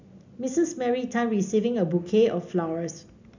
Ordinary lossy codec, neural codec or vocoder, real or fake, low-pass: none; none; real; 7.2 kHz